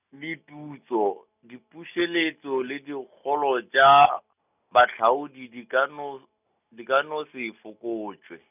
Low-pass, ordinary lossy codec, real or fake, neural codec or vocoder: 3.6 kHz; none; real; none